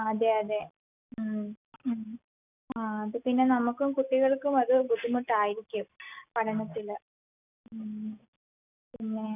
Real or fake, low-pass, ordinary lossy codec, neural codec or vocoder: real; 3.6 kHz; none; none